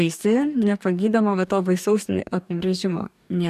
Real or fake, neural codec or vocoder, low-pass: fake; codec, 44.1 kHz, 2.6 kbps, DAC; 14.4 kHz